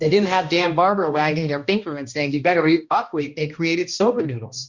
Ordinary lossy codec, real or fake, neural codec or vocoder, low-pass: Opus, 64 kbps; fake; codec, 16 kHz, 1 kbps, X-Codec, HuBERT features, trained on balanced general audio; 7.2 kHz